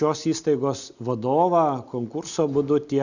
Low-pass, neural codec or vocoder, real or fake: 7.2 kHz; none; real